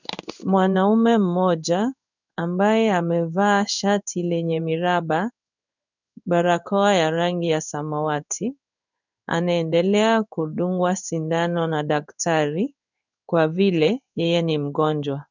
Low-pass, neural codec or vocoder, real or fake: 7.2 kHz; codec, 16 kHz in and 24 kHz out, 1 kbps, XY-Tokenizer; fake